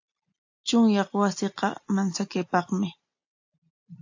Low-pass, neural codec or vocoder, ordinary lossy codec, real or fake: 7.2 kHz; none; AAC, 48 kbps; real